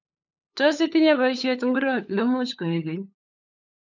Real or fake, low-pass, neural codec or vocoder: fake; 7.2 kHz; codec, 16 kHz, 8 kbps, FunCodec, trained on LibriTTS, 25 frames a second